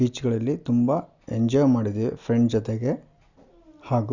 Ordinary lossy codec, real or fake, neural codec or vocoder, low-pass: none; real; none; 7.2 kHz